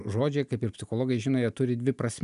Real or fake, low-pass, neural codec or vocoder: real; 10.8 kHz; none